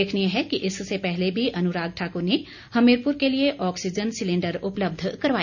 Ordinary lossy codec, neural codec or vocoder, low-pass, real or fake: none; none; 7.2 kHz; real